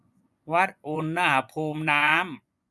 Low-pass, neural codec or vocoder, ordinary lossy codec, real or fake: none; vocoder, 24 kHz, 100 mel bands, Vocos; none; fake